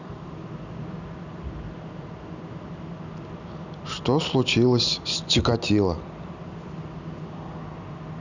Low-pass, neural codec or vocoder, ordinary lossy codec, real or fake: 7.2 kHz; none; none; real